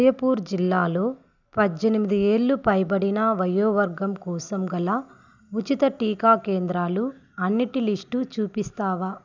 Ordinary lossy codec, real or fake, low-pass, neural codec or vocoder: none; real; 7.2 kHz; none